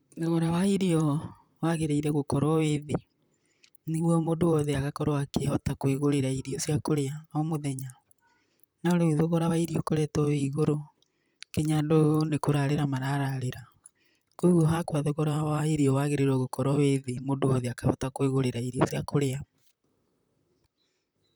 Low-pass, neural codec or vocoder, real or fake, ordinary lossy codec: none; vocoder, 44.1 kHz, 128 mel bands, Pupu-Vocoder; fake; none